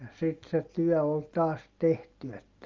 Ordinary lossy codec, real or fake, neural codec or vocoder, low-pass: Opus, 32 kbps; real; none; 7.2 kHz